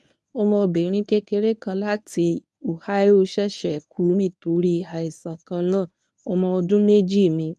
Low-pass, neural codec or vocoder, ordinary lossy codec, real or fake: none; codec, 24 kHz, 0.9 kbps, WavTokenizer, medium speech release version 1; none; fake